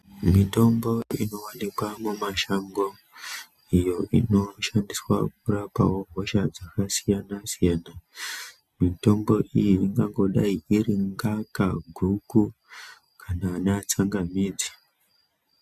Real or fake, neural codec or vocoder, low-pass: real; none; 14.4 kHz